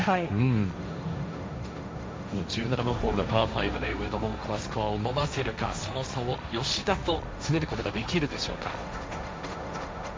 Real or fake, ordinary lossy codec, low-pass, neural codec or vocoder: fake; none; none; codec, 16 kHz, 1.1 kbps, Voila-Tokenizer